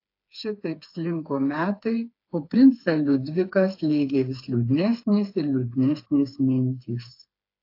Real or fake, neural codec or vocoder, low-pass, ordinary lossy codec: fake; codec, 16 kHz, 4 kbps, FreqCodec, smaller model; 5.4 kHz; AAC, 32 kbps